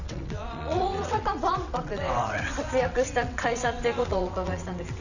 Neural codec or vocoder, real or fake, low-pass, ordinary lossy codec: vocoder, 22.05 kHz, 80 mel bands, WaveNeXt; fake; 7.2 kHz; none